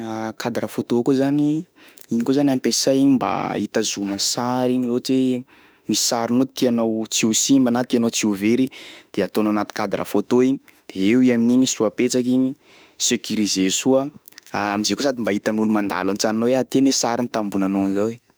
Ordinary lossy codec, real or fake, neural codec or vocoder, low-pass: none; fake; autoencoder, 48 kHz, 32 numbers a frame, DAC-VAE, trained on Japanese speech; none